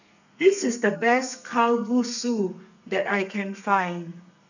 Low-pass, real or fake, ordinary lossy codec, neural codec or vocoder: 7.2 kHz; fake; none; codec, 32 kHz, 1.9 kbps, SNAC